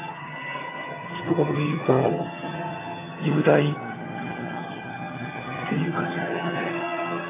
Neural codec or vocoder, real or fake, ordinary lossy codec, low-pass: vocoder, 22.05 kHz, 80 mel bands, HiFi-GAN; fake; AAC, 16 kbps; 3.6 kHz